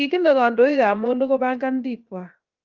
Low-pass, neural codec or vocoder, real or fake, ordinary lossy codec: 7.2 kHz; codec, 16 kHz, 0.7 kbps, FocalCodec; fake; Opus, 32 kbps